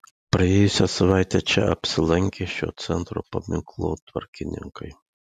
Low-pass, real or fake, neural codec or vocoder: 14.4 kHz; real; none